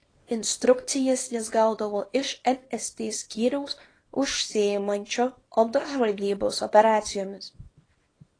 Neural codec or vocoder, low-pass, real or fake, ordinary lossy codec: codec, 24 kHz, 0.9 kbps, WavTokenizer, small release; 9.9 kHz; fake; AAC, 32 kbps